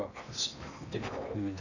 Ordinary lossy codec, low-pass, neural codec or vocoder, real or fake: none; 7.2 kHz; codec, 16 kHz in and 24 kHz out, 0.8 kbps, FocalCodec, streaming, 65536 codes; fake